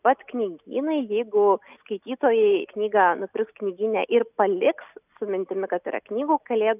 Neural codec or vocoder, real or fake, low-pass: none; real; 3.6 kHz